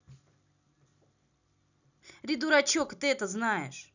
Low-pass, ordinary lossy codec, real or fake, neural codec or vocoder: 7.2 kHz; none; real; none